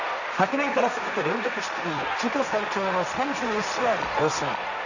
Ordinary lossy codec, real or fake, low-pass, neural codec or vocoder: none; fake; 7.2 kHz; codec, 16 kHz, 1.1 kbps, Voila-Tokenizer